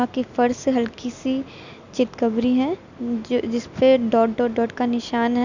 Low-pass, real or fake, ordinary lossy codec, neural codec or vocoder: 7.2 kHz; real; none; none